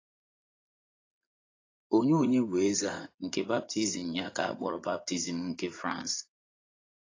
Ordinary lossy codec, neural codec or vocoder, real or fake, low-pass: AAC, 32 kbps; vocoder, 44.1 kHz, 80 mel bands, Vocos; fake; 7.2 kHz